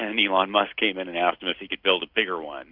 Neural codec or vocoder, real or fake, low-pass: none; real; 5.4 kHz